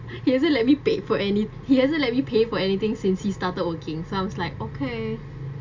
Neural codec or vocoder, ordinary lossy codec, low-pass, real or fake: none; MP3, 64 kbps; 7.2 kHz; real